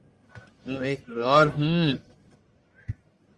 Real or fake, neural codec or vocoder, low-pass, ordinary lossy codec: fake; codec, 44.1 kHz, 1.7 kbps, Pupu-Codec; 10.8 kHz; Opus, 32 kbps